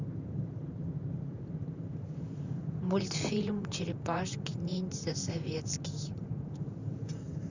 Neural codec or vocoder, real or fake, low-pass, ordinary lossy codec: vocoder, 44.1 kHz, 128 mel bands, Pupu-Vocoder; fake; 7.2 kHz; none